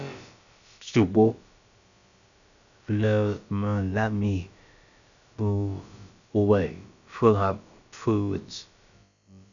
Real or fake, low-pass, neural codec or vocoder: fake; 7.2 kHz; codec, 16 kHz, about 1 kbps, DyCAST, with the encoder's durations